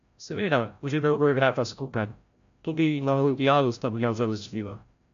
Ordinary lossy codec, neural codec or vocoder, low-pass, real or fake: MP3, 64 kbps; codec, 16 kHz, 0.5 kbps, FreqCodec, larger model; 7.2 kHz; fake